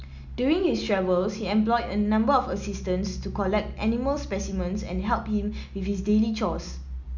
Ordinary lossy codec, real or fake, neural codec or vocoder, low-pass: none; real; none; 7.2 kHz